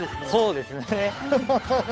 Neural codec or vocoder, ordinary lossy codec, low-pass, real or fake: codec, 16 kHz, 8 kbps, FunCodec, trained on Chinese and English, 25 frames a second; none; none; fake